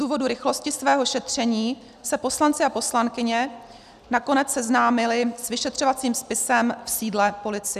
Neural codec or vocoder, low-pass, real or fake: none; 14.4 kHz; real